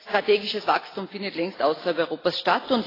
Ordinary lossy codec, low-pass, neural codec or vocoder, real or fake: AAC, 24 kbps; 5.4 kHz; none; real